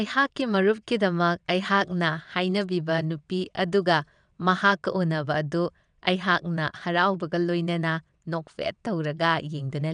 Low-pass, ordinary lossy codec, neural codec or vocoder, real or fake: 9.9 kHz; none; vocoder, 22.05 kHz, 80 mel bands, WaveNeXt; fake